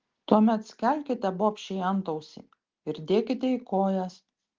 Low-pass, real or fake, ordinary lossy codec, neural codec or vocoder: 7.2 kHz; real; Opus, 16 kbps; none